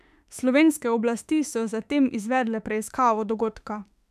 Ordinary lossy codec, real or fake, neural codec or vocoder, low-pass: none; fake; autoencoder, 48 kHz, 32 numbers a frame, DAC-VAE, trained on Japanese speech; 14.4 kHz